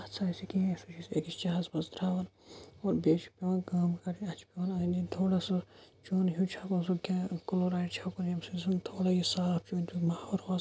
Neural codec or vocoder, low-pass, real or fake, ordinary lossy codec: none; none; real; none